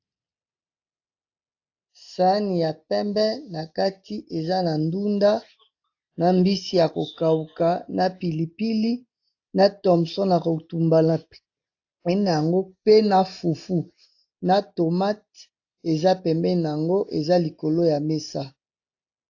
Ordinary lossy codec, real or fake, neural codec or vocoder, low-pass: AAC, 48 kbps; real; none; 7.2 kHz